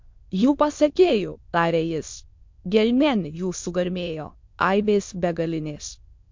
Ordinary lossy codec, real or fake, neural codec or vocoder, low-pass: MP3, 48 kbps; fake; autoencoder, 22.05 kHz, a latent of 192 numbers a frame, VITS, trained on many speakers; 7.2 kHz